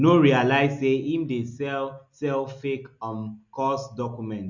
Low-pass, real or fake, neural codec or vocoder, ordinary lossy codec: 7.2 kHz; real; none; none